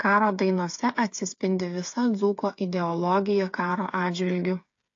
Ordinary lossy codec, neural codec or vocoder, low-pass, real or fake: AAC, 48 kbps; codec, 16 kHz, 8 kbps, FreqCodec, smaller model; 7.2 kHz; fake